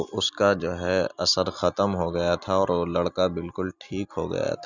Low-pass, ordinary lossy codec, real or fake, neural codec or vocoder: 7.2 kHz; none; real; none